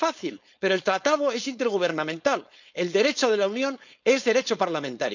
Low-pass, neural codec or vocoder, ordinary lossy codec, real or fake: 7.2 kHz; codec, 16 kHz, 4.8 kbps, FACodec; none; fake